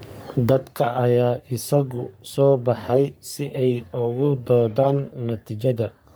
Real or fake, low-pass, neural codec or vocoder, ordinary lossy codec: fake; none; codec, 44.1 kHz, 3.4 kbps, Pupu-Codec; none